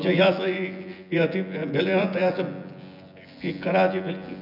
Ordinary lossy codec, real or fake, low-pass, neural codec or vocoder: none; fake; 5.4 kHz; vocoder, 24 kHz, 100 mel bands, Vocos